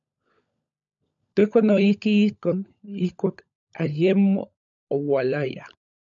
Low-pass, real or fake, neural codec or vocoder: 7.2 kHz; fake; codec, 16 kHz, 16 kbps, FunCodec, trained on LibriTTS, 50 frames a second